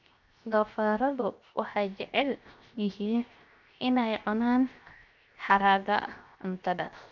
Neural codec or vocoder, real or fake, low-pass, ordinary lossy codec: codec, 16 kHz, 0.7 kbps, FocalCodec; fake; 7.2 kHz; none